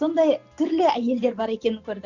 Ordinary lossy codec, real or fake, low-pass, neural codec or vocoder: none; real; 7.2 kHz; none